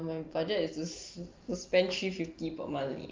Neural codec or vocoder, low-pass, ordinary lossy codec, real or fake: none; 7.2 kHz; Opus, 24 kbps; real